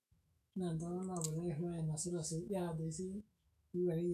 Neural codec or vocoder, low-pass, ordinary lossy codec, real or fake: autoencoder, 48 kHz, 128 numbers a frame, DAC-VAE, trained on Japanese speech; 14.4 kHz; none; fake